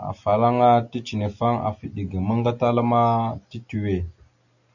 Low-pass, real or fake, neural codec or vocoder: 7.2 kHz; real; none